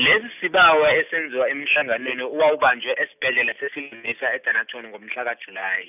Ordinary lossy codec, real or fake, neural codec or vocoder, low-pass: none; real; none; 3.6 kHz